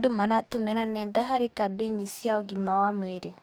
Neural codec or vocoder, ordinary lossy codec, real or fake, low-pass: codec, 44.1 kHz, 2.6 kbps, DAC; none; fake; none